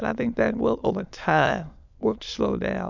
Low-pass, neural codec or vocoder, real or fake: 7.2 kHz; autoencoder, 22.05 kHz, a latent of 192 numbers a frame, VITS, trained on many speakers; fake